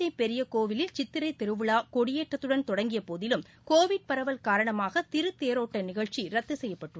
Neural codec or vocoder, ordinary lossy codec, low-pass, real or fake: none; none; none; real